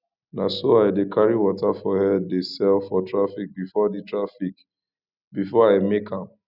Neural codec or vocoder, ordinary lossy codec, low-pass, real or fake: none; none; 5.4 kHz; real